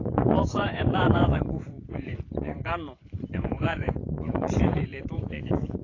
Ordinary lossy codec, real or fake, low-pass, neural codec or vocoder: AAC, 32 kbps; fake; 7.2 kHz; vocoder, 24 kHz, 100 mel bands, Vocos